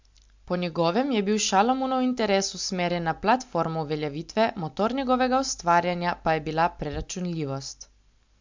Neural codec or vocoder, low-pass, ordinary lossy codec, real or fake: none; 7.2 kHz; MP3, 64 kbps; real